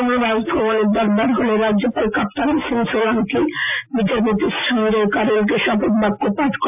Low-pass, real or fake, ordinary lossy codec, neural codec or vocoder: 3.6 kHz; real; AAC, 32 kbps; none